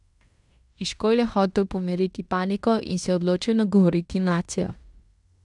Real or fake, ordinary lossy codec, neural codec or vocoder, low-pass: fake; none; codec, 16 kHz in and 24 kHz out, 0.9 kbps, LongCat-Audio-Codec, fine tuned four codebook decoder; 10.8 kHz